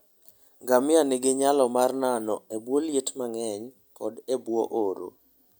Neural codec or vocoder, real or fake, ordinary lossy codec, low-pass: none; real; none; none